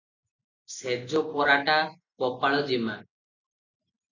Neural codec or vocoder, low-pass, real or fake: none; 7.2 kHz; real